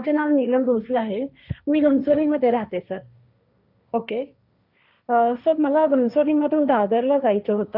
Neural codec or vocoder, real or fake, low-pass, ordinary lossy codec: codec, 16 kHz, 1.1 kbps, Voila-Tokenizer; fake; 5.4 kHz; none